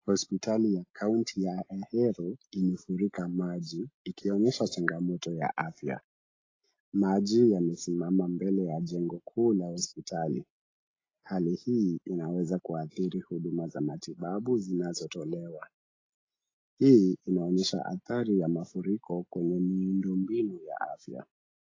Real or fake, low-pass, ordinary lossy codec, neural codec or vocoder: real; 7.2 kHz; AAC, 32 kbps; none